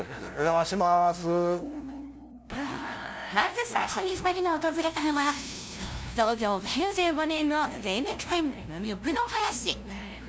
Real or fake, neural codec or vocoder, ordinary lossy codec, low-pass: fake; codec, 16 kHz, 0.5 kbps, FunCodec, trained on LibriTTS, 25 frames a second; none; none